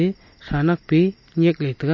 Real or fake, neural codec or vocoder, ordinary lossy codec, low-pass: real; none; MP3, 32 kbps; 7.2 kHz